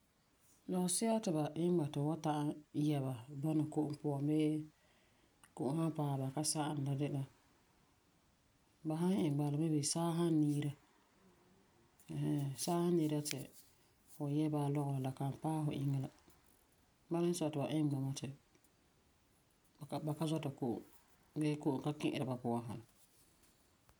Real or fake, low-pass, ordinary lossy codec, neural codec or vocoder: real; none; none; none